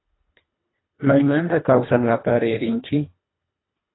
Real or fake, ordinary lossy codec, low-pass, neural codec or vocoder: fake; AAC, 16 kbps; 7.2 kHz; codec, 24 kHz, 1.5 kbps, HILCodec